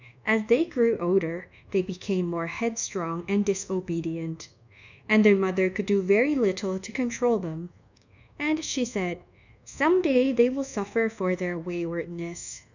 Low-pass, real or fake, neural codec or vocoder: 7.2 kHz; fake; codec, 24 kHz, 1.2 kbps, DualCodec